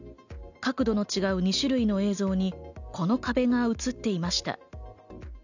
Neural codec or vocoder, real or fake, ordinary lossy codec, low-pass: none; real; none; 7.2 kHz